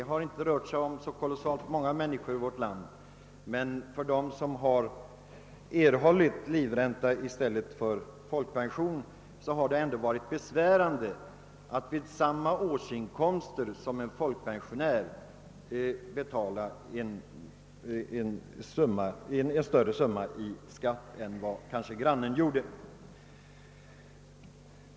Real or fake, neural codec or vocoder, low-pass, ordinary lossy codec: real; none; none; none